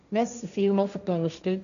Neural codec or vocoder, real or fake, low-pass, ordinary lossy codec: codec, 16 kHz, 1.1 kbps, Voila-Tokenizer; fake; 7.2 kHz; none